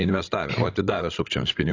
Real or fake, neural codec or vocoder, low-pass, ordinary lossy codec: fake; codec, 16 kHz, 16 kbps, FreqCodec, larger model; 7.2 kHz; AAC, 32 kbps